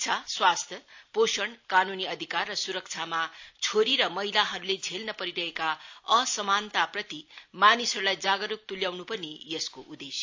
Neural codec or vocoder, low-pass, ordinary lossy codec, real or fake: none; 7.2 kHz; AAC, 48 kbps; real